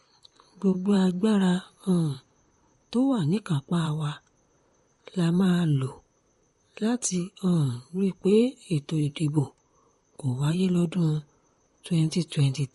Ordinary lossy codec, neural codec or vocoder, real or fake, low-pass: MP3, 48 kbps; vocoder, 44.1 kHz, 128 mel bands, Pupu-Vocoder; fake; 19.8 kHz